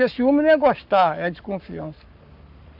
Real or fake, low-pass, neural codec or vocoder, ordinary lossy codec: fake; 5.4 kHz; codec, 44.1 kHz, 7.8 kbps, Pupu-Codec; none